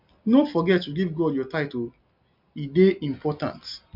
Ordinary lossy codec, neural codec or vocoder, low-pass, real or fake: MP3, 48 kbps; none; 5.4 kHz; real